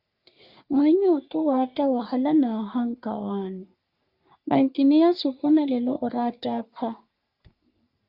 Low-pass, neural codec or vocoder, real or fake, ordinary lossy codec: 5.4 kHz; codec, 44.1 kHz, 3.4 kbps, Pupu-Codec; fake; Opus, 64 kbps